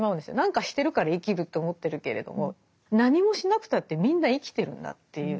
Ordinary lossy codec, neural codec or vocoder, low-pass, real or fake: none; none; none; real